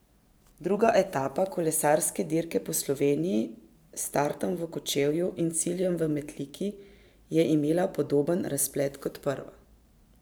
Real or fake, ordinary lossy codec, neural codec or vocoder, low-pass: fake; none; vocoder, 44.1 kHz, 128 mel bands every 512 samples, BigVGAN v2; none